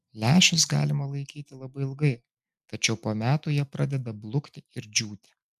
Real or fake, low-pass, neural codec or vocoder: real; 14.4 kHz; none